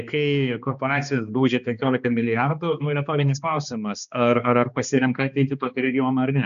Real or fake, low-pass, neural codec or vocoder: fake; 7.2 kHz; codec, 16 kHz, 2 kbps, X-Codec, HuBERT features, trained on balanced general audio